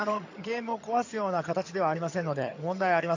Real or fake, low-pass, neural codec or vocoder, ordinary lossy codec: fake; 7.2 kHz; vocoder, 22.05 kHz, 80 mel bands, HiFi-GAN; AAC, 48 kbps